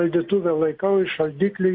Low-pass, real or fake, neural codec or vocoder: 5.4 kHz; real; none